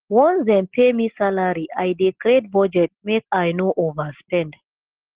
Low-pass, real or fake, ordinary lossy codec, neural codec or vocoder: 3.6 kHz; real; Opus, 16 kbps; none